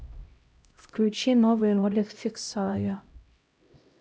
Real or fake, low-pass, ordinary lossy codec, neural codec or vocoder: fake; none; none; codec, 16 kHz, 0.5 kbps, X-Codec, HuBERT features, trained on LibriSpeech